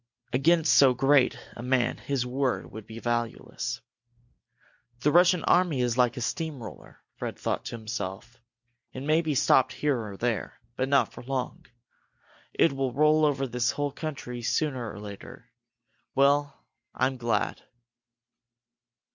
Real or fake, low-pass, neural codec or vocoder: real; 7.2 kHz; none